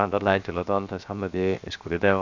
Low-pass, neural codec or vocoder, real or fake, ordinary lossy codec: 7.2 kHz; codec, 16 kHz, 0.7 kbps, FocalCodec; fake; none